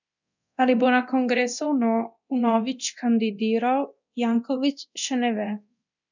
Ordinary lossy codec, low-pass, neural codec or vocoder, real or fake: none; 7.2 kHz; codec, 24 kHz, 0.9 kbps, DualCodec; fake